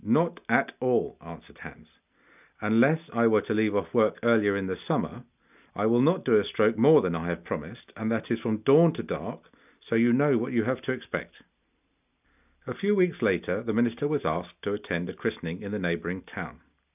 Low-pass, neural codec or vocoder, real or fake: 3.6 kHz; none; real